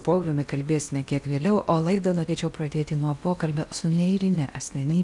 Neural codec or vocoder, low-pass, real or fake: codec, 16 kHz in and 24 kHz out, 0.8 kbps, FocalCodec, streaming, 65536 codes; 10.8 kHz; fake